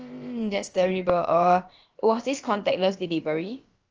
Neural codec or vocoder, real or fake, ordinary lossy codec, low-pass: codec, 16 kHz, about 1 kbps, DyCAST, with the encoder's durations; fake; Opus, 24 kbps; 7.2 kHz